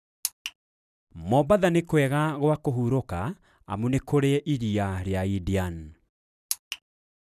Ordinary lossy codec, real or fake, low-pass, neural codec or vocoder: none; real; 14.4 kHz; none